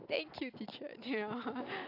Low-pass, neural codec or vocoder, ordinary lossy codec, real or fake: 5.4 kHz; none; AAC, 32 kbps; real